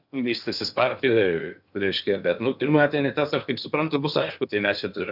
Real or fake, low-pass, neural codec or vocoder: fake; 5.4 kHz; codec, 16 kHz in and 24 kHz out, 0.8 kbps, FocalCodec, streaming, 65536 codes